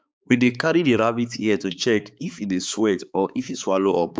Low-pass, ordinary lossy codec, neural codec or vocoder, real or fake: none; none; codec, 16 kHz, 4 kbps, X-Codec, HuBERT features, trained on balanced general audio; fake